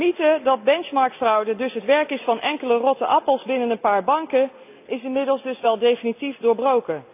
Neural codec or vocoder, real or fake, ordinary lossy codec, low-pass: none; real; AAC, 32 kbps; 3.6 kHz